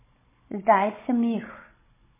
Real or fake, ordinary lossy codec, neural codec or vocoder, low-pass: fake; MP3, 16 kbps; codec, 16 kHz, 16 kbps, FunCodec, trained on Chinese and English, 50 frames a second; 3.6 kHz